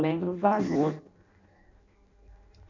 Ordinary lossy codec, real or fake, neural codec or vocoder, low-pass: none; fake; codec, 16 kHz in and 24 kHz out, 0.6 kbps, FireRedTTS-2 codec; 7.2 kHz